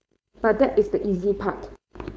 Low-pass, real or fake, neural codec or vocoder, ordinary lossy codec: none; fake; codec, 16 kHz, 4.8 kbps, FACodec; none